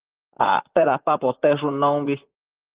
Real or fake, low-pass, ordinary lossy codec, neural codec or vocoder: fake; 3.6 kHz; Opus, 16 kbps; vocoder, 44.1 kHz, 128 mel bands, Pupu-Vocoder